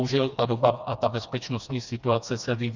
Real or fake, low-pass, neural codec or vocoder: fake; 7.2 kHz; codec, 16 kHz, 2 kbps, FreqCodec, smaller model